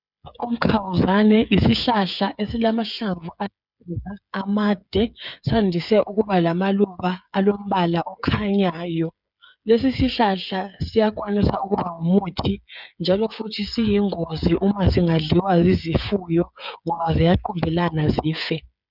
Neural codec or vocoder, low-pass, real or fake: codec, 16 kHz, 8 kbps, FreqCodec, smaller model; 5.4 kHz; fake